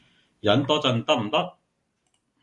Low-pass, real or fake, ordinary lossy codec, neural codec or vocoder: 10.8 kHz; real; Opus, 64 kbps; none